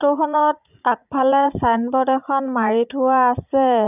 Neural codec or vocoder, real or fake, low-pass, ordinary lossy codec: vocoder, 44.1 kHz, 80 mel bands, Vocos; fake; 3.6 kHz; none